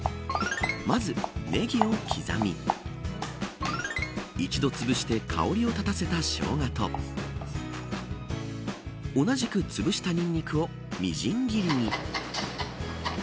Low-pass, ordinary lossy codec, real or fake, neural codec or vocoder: none; none; real; none